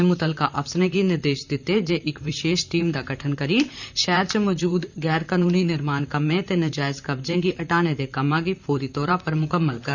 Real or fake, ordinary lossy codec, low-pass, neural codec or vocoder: fake; none; 7.2 kHz; vocoder, 44.1 kHz, 128 mel bands, Pupu-Vocoder